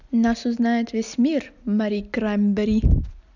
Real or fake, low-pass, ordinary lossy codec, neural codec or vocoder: real; 7.2 kHz; none; none